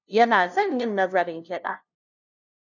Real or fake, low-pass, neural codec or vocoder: fake; 7.2 kHz; codec, 16 kHz, 0.5 kbps, FunCodec, trained on LibriTTS, 25 frames a second